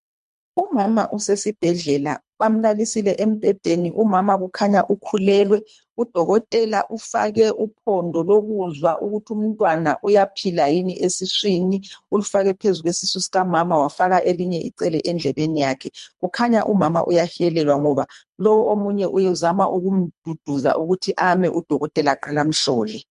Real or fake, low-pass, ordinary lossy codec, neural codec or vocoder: fake; 10.8 kHz; MP3, 64 kbps; codec, 24 kHz, 3 kbps, HILCodec